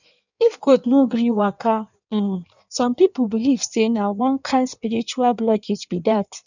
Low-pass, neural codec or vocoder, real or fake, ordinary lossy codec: 7.2 kHz; codec, 16 kHz in and 24 kHz out, 1.1 kbps, FireRedTTS-2 codec; fake; none